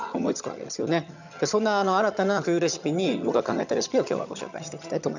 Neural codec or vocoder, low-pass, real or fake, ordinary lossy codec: vocoder, 22.05 kHz, 80 mel bands, HiFi-GAN; 7.2 kHz; fake; none